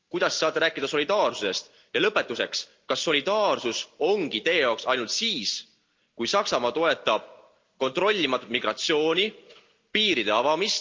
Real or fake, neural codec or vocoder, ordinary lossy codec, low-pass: real; none; Opus, 24 kbps; 7.2 kHz